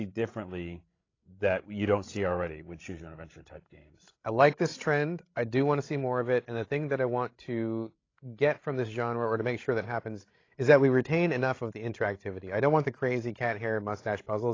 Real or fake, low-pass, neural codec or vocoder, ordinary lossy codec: fake; 7.2 kHz; codec, 16 kHz, 16 kbps, FreqCodec, larger model; AAC, 32 kbps